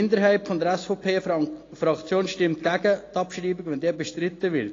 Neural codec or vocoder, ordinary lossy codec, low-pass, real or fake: none; AAC, 32 kbps; 7.2 kHz; real